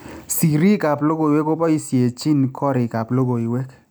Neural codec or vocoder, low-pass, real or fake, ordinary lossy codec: none; none; real; none